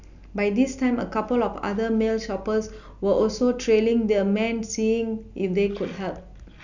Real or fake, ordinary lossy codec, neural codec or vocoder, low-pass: real; none; none; 7.2 kHz